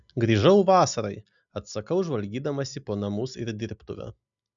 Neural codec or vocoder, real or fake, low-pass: none; real; 7.2 kHz